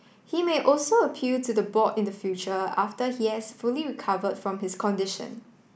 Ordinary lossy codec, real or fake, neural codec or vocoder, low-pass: none; real; none; none